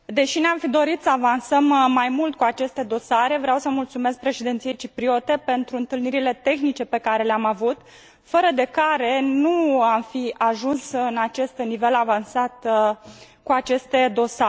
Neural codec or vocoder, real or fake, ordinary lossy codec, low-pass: none; real; none; none